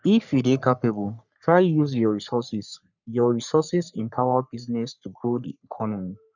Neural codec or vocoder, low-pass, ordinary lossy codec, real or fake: codec, 44.1 kHz, 3.4 kbps, Pupu-Codec; 7.2 kHz; none; fake